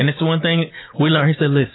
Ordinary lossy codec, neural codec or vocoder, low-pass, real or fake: AAC, 16 kbps; none; 7.2 kHz; real